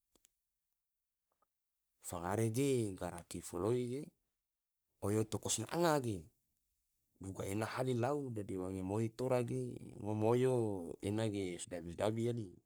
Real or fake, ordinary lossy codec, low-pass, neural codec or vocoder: fake; none; none; codec, 44.1 kHz, 3.4 kbps, Pupu-Codec